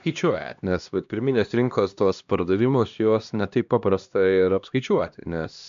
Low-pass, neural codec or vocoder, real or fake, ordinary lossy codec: 7.2 kHz; codec, 16 kHz, 1 kbps, X-Codec, HuBERT features, trained on LibriSpeech; fake; MP3, 64 kbps